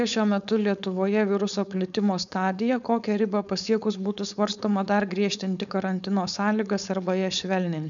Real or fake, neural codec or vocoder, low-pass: fake; codec, 16 kHz, 4.8 kbps, FACodec; 7.2 kHz